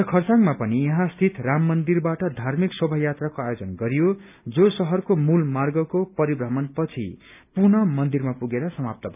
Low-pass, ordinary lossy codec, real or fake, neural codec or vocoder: 3.6 kHz; none; real; none